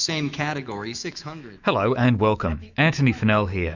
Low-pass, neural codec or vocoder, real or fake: 7.2 kHz; autoencoder, 48 kHz, 128 numbers a frame, DAC-VAE, trained on Japanese speech; fake